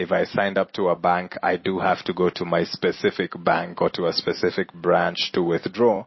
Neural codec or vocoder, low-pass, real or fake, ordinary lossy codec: none; 7.2 kHz; real; MP3, 24 kbps